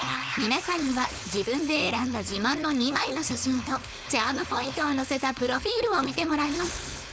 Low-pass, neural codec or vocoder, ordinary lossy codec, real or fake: none; codec, 16 kHz, 4.8 kbps, FACodec; none; fake